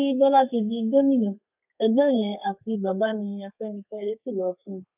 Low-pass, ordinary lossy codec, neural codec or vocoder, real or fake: 3.6 kHz; none; codec, 32 kHz, 1.9 kbps, SNAC; fake